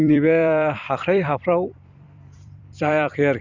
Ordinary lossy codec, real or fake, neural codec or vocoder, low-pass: Opus, 64 kbps; fake; vocoder, 44.1 kHz, 128 mel bands every 256 samples, BigVGAN v2; 7.2 kHz